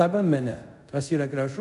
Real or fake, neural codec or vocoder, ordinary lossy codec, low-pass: fake; codec, 24 kHz, 0.5 kbps, DualCodec; MP3, 96 kbps; 10.8 kHz